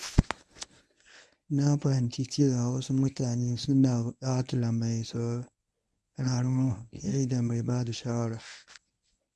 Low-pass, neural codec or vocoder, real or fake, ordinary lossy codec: none; codec, 24 kHz, 0.9 kbps, WavTokenizer, medium speech release version 1; fake; none